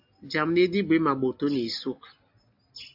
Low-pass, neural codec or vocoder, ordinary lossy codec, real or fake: 5.4 kHz; none; AAC, 48 kbps; real